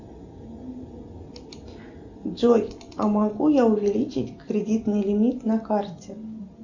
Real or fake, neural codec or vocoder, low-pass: real; none; 7.2 kHz